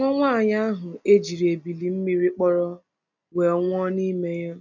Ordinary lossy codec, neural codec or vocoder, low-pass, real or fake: none; none; 7.2 kHz; real